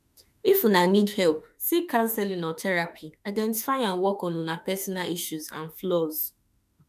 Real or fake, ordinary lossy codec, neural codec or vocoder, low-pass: fake; none; autoencoder, 48 kHz, 32 numbers a frame, DAC-VAE, trained on Japanese speech; 14.4 kHz